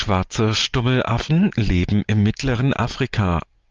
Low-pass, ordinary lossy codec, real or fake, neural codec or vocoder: 7.2 kHz; Opus, 32 kbps; real; none